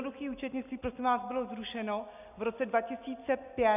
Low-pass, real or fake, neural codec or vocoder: 3.6 kHz; real; none